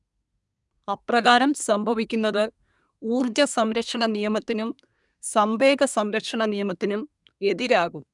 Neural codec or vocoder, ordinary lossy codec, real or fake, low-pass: codec, 24 kHz, 1 kbps, SNAC; none; fake; 10.8 kHz